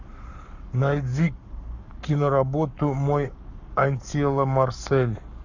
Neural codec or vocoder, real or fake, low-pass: codec, 44.1 kHz, 7.8 kbps, Pupu-Codec; fake; 7.2 kHz